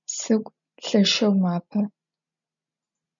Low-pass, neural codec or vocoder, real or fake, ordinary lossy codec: 7.2 kHz; none; real; MP3, 96 kbps